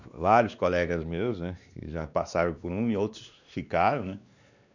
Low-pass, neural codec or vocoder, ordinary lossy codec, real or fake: 7.2 kHz; codec, 16 kHz, 2 kbps, X-Codec, WavLM features, trained on Multilingual LibriSpeech; none; fake